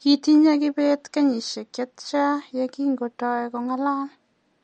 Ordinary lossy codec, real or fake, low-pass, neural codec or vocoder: MP3, 48 kbps; real; 19.8 kHz; none